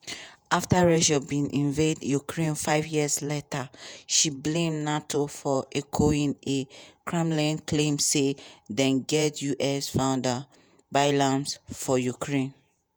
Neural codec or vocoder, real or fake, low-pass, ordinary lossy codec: vocoder, 48 kHz, 128 mel bands, Vocos; fake; none; none